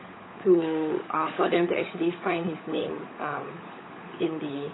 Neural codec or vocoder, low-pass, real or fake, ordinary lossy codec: codec, 16 kHz, 16 kbps, FunCodec, trained on LibriTTS, 50 frames a second; 7.2 kHz; fake; AAC, 16 kbps